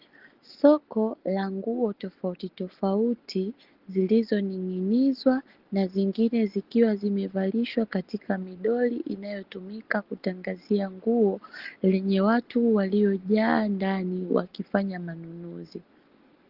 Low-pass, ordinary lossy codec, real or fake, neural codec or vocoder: 5.4 kHz; Opus, 16 kbps; real; none